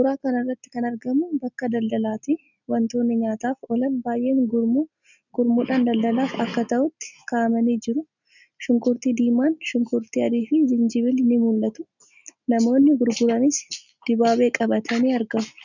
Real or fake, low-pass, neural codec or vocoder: real; 7.2 kHz; none